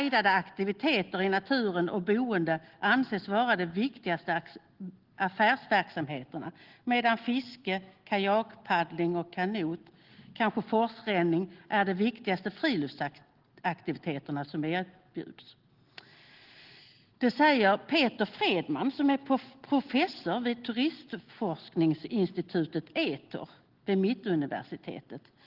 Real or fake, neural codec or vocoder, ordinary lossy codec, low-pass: real; none; Opus, 16 kbps; 5.4 kHz